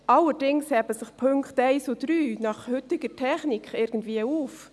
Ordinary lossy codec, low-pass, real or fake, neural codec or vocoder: none; none; real; none